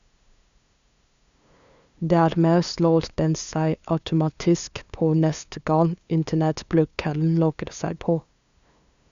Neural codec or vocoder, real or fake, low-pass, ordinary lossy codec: codec, 16 kHz, 2 kbps, FunCodec, trained on LibriTTS, 25 frames a second; fake; 7.2 kHz; Opus, 64 kbps